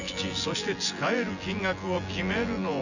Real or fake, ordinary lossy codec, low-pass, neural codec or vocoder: fake; none; 7.2 kHz; vocoder, 24 kHz, 100 mel bands, Vocos